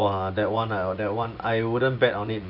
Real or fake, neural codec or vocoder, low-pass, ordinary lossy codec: fake; vocoder, 24 kHz, 100 mel bands, Vocos; 5.4 kHz; none